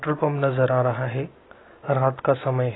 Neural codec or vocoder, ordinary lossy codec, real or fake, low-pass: none; AAC, 16 kbps; real; 7.2 kHz